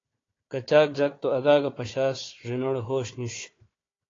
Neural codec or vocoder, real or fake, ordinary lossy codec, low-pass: codec, 16 kHz, 4 kbps, FunCodec, trained on Chinese and English, 50 frames a second; fake; AAC, 32 kbps; 7.2 kHz